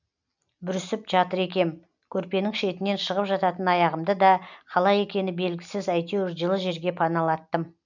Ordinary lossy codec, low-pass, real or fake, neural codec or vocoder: none; 7.2 kHz; real; none